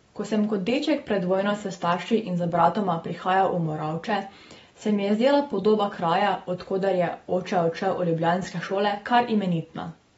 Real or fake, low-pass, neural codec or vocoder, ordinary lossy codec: real; 19.8 kHz; none; AAC, 24 kbps